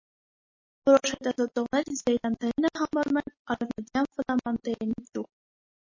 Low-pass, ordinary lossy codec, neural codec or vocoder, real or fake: 7.2 kHz; MP3, 32 kbps; none; real